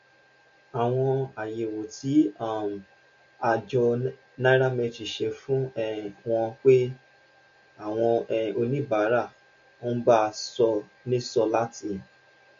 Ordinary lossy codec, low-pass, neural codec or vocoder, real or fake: MP3, 48 kbps; 7.2 kHz; none; real